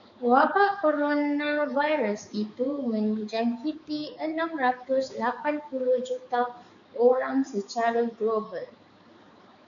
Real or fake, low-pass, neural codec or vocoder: fake; 7.2 kHz; codec, 16 kHz, 4 kbps, X-Codec, HuBERT features, trained on balanced general audio